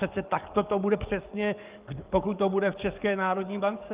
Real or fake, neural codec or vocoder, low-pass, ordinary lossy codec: fake; codec, 44.1 kHz, 7.8 kbps, DAC; 3.6 kHz; Opus, 32 kbps